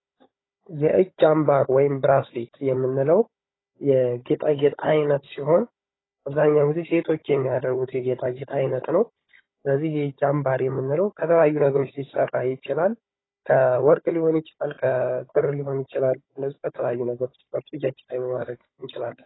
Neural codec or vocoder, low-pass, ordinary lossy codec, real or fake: codec, 16 kHz, 16 kbps, FunCodec, trained on Chinese and English, 50 frames a second; 7.2 kHz; AAC, 16 kbps; fake